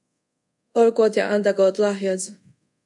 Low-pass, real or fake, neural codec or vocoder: 10.8 kHz; fake; codec, 24 kHz, 0.5 kbps, DualCodec